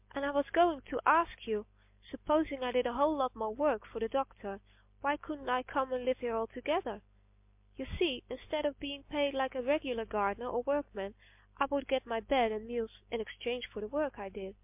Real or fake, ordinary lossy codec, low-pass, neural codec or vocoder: real; MP3, 32 kbps; 3.6 kHz; none